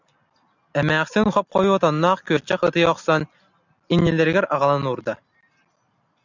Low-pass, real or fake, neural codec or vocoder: 7.2 kHz; real; none